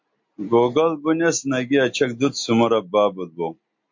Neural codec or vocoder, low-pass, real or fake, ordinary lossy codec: none; 7.2 kHz; real; MP3, 48 kbps